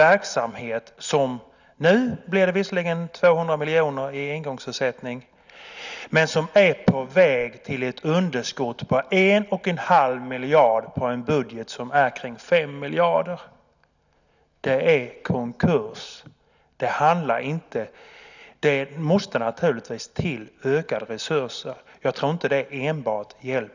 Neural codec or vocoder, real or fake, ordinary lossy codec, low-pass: none; real; none; 7.2 kHz